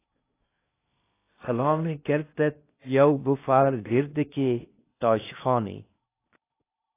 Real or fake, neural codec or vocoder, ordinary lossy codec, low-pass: fake; codec, 16 kHz in and 24 kHz out, 0.6 kbps, FocalCodec, streaming, 2048 codes; AAC, 24 kbps; 3.6 kHz